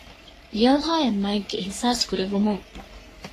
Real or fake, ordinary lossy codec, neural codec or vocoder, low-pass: fake; AAC, 48 kbps; codec, 44.1 kHz, 3.4 kbps, Pupu-Codec; 14.4 kHz